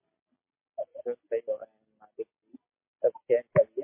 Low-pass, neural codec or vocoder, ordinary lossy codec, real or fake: 3.6 kHz; none; MP3, 24 kbps; real